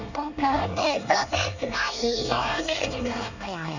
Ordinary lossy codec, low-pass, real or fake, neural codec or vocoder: none; 7.2 kHz; fake; codec, 24 kHz, 1 kbps, SNAC